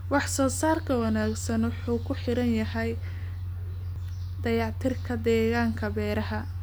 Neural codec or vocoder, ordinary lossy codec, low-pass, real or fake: none; none; none; real